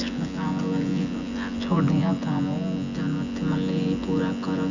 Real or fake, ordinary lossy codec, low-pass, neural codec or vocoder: fake; none; 7.2 kHz; vocoder, 24 kHz, 100 mel bands, Vocos